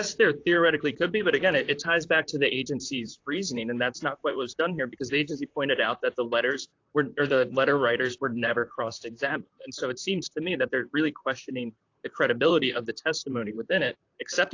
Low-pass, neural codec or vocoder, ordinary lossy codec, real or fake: 7.2 kHz; vocoder, 44.1 kHz, 128 mel bands, Pupu-Vocoder; AAC, 48 kbps; fake